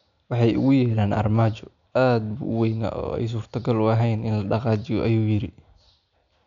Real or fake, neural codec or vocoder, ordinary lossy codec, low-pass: real; none; none; 7.2 kHz